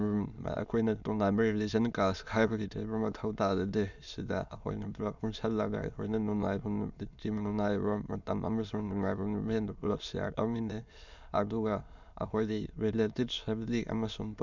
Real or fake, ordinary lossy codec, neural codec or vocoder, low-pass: fake; none; autoencoder, 22.05 kHz, a latent of 192 numbers a frame, VITS, trained on many speakers; 7.2 kHz